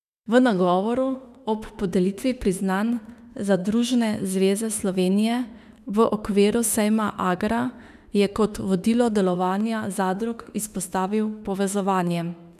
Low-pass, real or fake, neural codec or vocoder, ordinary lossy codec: 14.4 kHz; fake; autoencoder, 48 kHz, 32 numbers a frame, DAC-VAE, trained on Japanese speech; none